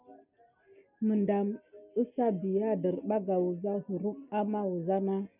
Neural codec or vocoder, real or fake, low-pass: none; real; 3.6 kHz